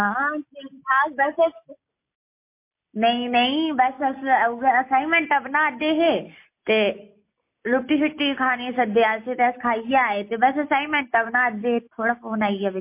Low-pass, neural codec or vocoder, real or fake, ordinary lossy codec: 3.6 kHz; none; real; MP3, 24 kbps